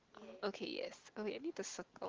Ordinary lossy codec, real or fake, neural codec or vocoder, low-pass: Opus, 16 kbps; real; none; 7.2 kHz